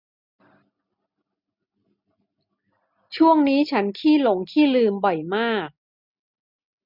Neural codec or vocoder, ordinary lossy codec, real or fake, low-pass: none; none; real; 5.4 kHz